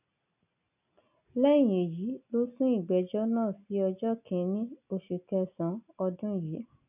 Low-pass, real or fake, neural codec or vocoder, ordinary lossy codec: 3.6 kHz; real; none; none